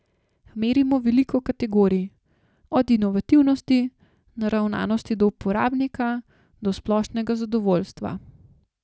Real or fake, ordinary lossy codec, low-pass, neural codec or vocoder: real; none; none; none